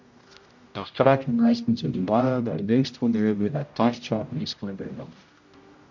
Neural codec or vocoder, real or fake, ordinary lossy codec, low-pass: codec, 16 kHz, 0.5 kbps, X-Codec, HuBERT features, trained on general audio; fake; MP3, 48 kbps; 7.2 kHz